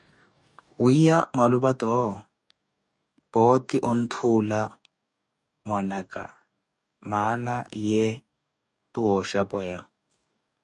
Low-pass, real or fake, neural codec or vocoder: 10.8 kHz; fake; codec, 44.1 kHz, 2.6 kbps, DAC